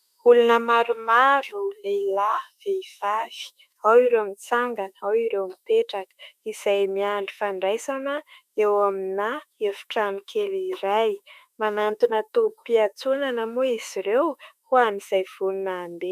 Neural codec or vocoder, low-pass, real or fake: autoencoder, 48 kHz, 32 numbers a frame, DAC-VAE, trained on Japanese speech; 14.4 kHz; fake